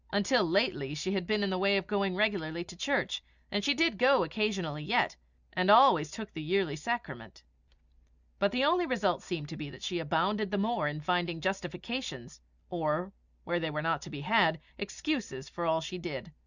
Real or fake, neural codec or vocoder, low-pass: real; none; 7.2 kHz